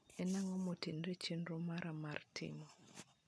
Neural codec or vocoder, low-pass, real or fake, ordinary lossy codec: none; none; real; none